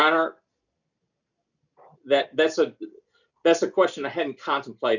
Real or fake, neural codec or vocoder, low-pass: real; none; 7.2 kHz